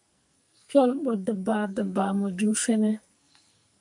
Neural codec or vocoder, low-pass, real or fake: codec, 44.1 kHz, 2.6 kbps, SNAC; 10.8 kHz; fake